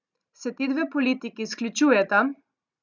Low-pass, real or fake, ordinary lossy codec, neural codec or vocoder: 7.2 kHz; real; none; none